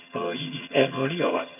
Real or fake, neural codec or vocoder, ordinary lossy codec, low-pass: fake; vocoder, 22.05 kHz, 80 mel bands, HiFi-GAN; none; 3.6 kHz